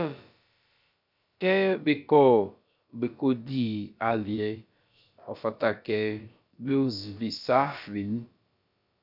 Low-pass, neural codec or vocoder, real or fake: 5.4 kHz; codec, 16 kHz, about 1 kbps, DyCAST, with the encoder's durations; fake